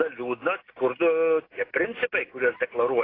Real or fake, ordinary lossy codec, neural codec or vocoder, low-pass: real; AAC, 24 kbps; none; 5.4 kHz